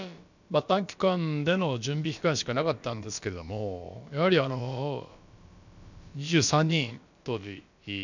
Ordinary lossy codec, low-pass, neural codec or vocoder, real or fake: none; 7.2 kHz; codec, 16 kHz, about 1 kbps, DyCAST, with the encoder's durations; fake